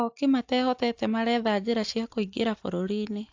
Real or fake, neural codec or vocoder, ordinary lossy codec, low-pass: real; none; AAC, 48 kbps; 7.2 kHz